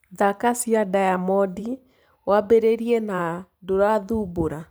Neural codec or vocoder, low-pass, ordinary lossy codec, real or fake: vocoder, 44.1 kHz, 128 mel bands every 512 samples, BigVGAN v2; none; none; fake